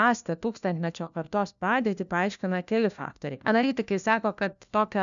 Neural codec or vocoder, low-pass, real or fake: codec, 16 kHz, 1 kbps, FunCodec, trained on LibriTTS, 50 frames a second; 7.2 kHz; fake